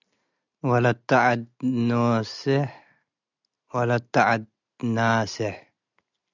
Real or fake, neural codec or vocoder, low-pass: real; none; 7.2 kHz